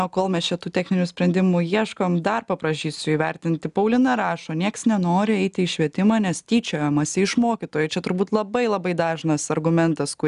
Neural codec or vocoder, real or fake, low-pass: none; real; 10.8 kHz